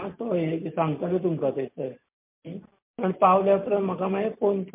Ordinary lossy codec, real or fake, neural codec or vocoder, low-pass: MP3, 32 kbps; real; none; 3.6 kHz